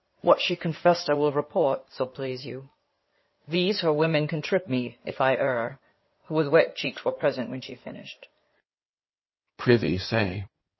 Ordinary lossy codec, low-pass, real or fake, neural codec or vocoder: MP3, 24 kbps; 7.2 kHz; fake; codec, 16 kHz in and 24 kHz out, 2.2 kbps, FireRedTTS-2 codec